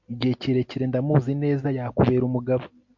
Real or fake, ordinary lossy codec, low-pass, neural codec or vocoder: real; MP3, 48 kbps; 7.2 kHz; none